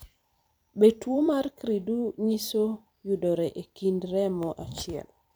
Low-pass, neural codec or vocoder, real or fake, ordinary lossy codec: none; vocoder, 44.1 kHz, 128 mel bands every 256 samples, BigVGAN v2; fake; none